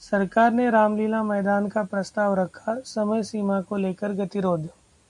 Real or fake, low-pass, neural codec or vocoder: real; 10.8 kHz; none